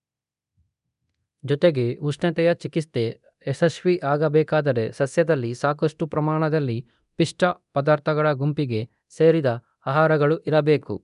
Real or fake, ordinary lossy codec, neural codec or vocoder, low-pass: fake; none; codec, 24 kHz, 0.9 kbps, DualCodec; 10.8 kHz